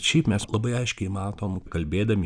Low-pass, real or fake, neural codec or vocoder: 9.9 kHz; real; none